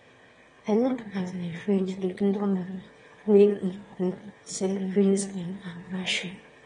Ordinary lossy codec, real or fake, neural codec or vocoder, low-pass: AAC, 32 kbps; fake; autoencoder, 22.05 kHz, a latent of 192 numbers a frame, VITS, trained on one speaker; 9.9 kHz